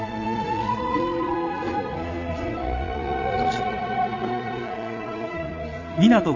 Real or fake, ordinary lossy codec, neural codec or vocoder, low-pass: fake; AAC, 48 kbps; vocoder, 22.05 kHz, 80 mel bands, Vocos; 7.2 kHz